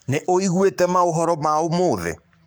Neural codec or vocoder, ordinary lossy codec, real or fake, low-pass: codec, 44.1 kHz, 7.8 kbps, Pupu-Codec; none; fake; none